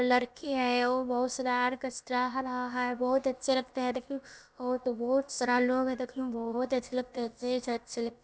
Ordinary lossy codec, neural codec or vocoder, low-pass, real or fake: none; codec, 16 kHz, about 1 kbps, DyCAST, with the encoder's durations; none; fake